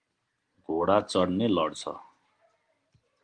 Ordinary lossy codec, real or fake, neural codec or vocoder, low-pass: Opus, 24 kbps; real; none; 9.9 kHz